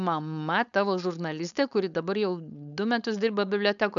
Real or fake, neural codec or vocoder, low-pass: fake; codec, 16 kHz, 4.8 kbps, FACodec; 7.2 kHz